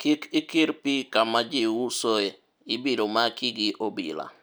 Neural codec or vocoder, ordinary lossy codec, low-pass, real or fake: none; none; none; real